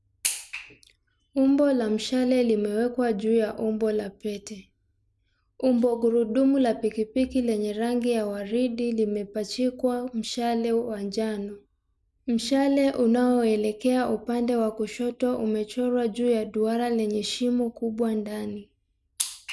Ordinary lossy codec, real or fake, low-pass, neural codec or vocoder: none; real; none; none